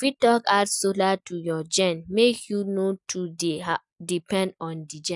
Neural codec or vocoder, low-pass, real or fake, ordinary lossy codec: none; 10.8 kHz; real; none